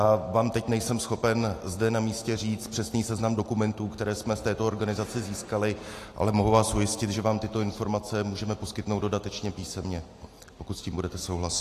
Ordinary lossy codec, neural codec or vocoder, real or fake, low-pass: AAC, 48 kbps; none; real; 14.4 kHz